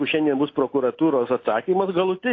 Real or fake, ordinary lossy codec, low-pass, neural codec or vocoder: real; AAC, 32 kbps; 7.2 kHz; none